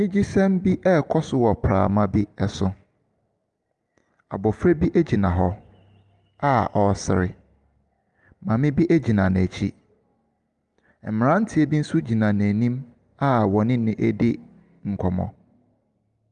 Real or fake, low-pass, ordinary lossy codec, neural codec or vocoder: real; 10.8 kHz; Opus, 32 kbps; none